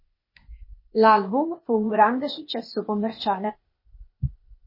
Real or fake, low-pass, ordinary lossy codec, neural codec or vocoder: fake; 5.4 kHz; MP3, 24 kbps; codec, 16 kHz, 0.8 kbps, ZipCodec